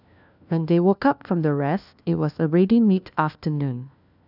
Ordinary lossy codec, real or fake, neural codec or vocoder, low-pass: none; fake; codec, 16 kHz, 1 kbps, FunCodec, trained on LibriTTS, 50 frames a second; 5.4 kHz